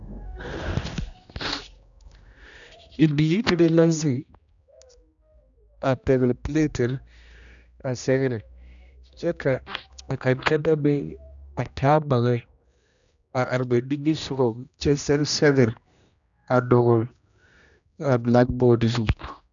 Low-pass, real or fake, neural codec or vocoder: 7.2 kHz; fake; codec, 16 kHz, 1 kbps, X-Codec, HuBERT features, trained on general audio